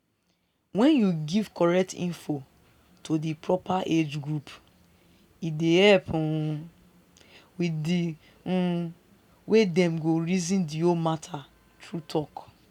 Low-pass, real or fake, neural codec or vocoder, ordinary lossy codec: 19.8 kHz; real; none; none